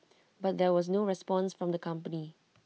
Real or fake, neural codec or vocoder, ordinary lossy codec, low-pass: real; none; none; none